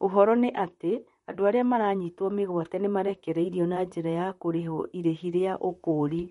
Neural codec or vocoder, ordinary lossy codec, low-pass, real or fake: vocoder, 44.1 kHz, 128 mel bands, Pupu-Vocoder; MP3, 48 kbps; 19.8 kHz; fake